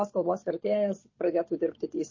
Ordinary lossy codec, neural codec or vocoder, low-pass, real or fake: MP3, 32 kbps; none; 7.2 kHz; real